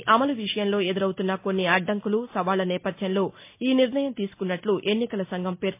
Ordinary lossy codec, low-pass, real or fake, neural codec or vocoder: MP3, 24 kbps; 3.6 kHz; real; none